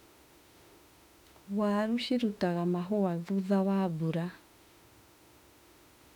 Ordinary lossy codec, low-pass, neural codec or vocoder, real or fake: none; 19.8 kHz; autoencoder, 48 kHz, 32 numbers a frame, DAC-VAE, trained on Japanese speech; fake